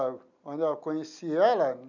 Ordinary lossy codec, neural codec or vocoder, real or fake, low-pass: none; none; real; 7.2 kHz